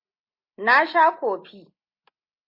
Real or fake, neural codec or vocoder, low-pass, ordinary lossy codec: real; none; 5.4 kHz; MP3, 32 kbps